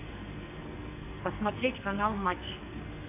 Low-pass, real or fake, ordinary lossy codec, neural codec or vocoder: 3.6 kHz; fake; MP3, 32 kbps; codec, 44.1 kHz, 2.6 kbps, SNAC